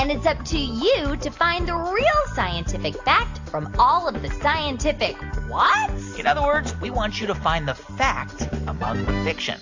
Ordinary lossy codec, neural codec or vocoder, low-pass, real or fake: AAC, 48 kbps; none; 7.2 kHz; real